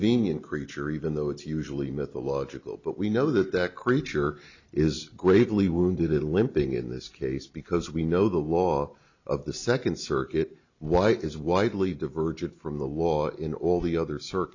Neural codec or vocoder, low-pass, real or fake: none; 7.2 kHz; real